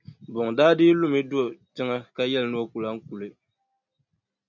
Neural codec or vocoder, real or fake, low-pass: none; real; 7.2 kHz